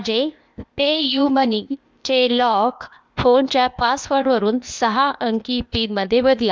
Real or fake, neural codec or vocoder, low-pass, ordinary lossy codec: fake; codec, 16 kHz, 0.8 kbps, ZipCodec; none; none